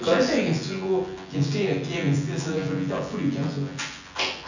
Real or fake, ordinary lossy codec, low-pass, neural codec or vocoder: fake; none; 7.2 kHz; vocoder, 24 kHz, 100 mel bands, Vocos